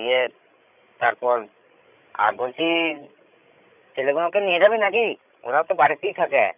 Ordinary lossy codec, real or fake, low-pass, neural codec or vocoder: none; fake; 3.6 kHz; codec, 16 kHz, 16 kbps, FreqCodec, larger model